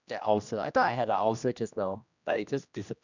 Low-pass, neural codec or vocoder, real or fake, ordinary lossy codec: 7.2 kHz; codec, 16 kHz, 1 kbps, X-Codec, HuBERT features, trained on general audio; fake; none